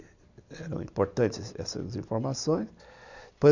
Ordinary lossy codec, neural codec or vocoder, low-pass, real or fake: none; none; 7.2 kHz; real